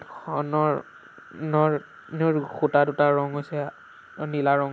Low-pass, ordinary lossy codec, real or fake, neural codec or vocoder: none; none; real; none